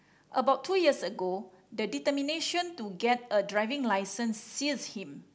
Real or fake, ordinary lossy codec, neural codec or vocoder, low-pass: real; none; none; none